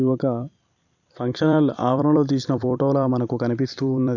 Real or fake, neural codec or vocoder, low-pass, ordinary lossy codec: fake; vocoder, 44.1 kHz, 128 mel bands every 256 samples, BigVGAN v2; 7.2 kHz; none